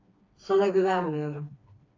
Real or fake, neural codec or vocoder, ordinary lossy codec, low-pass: fake; codec, 16 kHz, 2 kbps, FreqCodec, smaller model; AAC, 32 kbps; 7.2 kHz